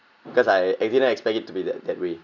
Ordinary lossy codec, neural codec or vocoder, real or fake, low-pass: none; none; real; 7.2 kHz